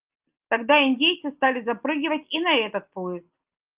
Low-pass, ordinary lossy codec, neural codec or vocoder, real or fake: 3.6 kHz; Opus, 32 kbps; none; real